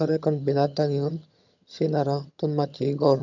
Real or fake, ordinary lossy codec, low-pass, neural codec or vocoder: fake; none; 7.2 kHz; vocoder, 22.05 kHz, 80 mel bands, HiFi-GAN